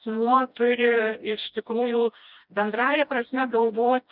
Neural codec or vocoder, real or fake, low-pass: codec, 16 kHz, 1 kbps, FreqCodec, smaller model; fake; 5.4 kHz